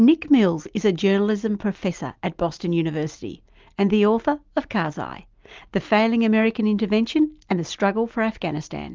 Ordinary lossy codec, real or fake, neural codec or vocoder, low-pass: Opus, 32 kbps; real; none; 7.2 kHz